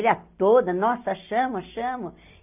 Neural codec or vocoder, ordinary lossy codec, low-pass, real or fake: none; AAC, 32 kbps; 3.6 kHz; real